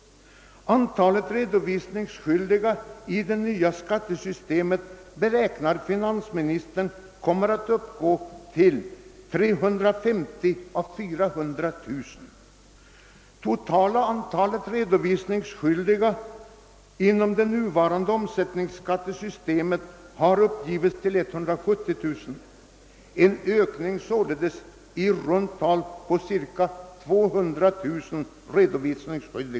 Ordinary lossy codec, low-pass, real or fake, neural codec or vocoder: none; none; real; none